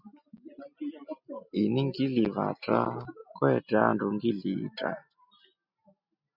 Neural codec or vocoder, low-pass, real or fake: none; 5.4 kHz; real